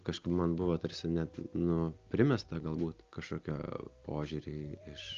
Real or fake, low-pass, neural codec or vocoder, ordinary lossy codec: real; 7.2 kHz; none; Opus, 24 kbps